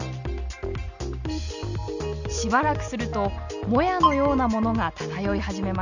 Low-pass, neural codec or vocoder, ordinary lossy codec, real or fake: 7.2 kHz; none; none; real